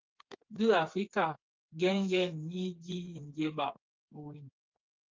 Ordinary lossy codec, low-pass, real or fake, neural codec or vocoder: Opus, 24 kbps; 7.2 kHz; fake; codec, 16 kHz, 4 kbps, FreqCodec, smaller model